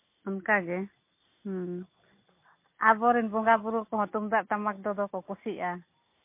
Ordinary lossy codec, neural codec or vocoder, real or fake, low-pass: MP3, 24 kbps; none; real; 3.6 kHz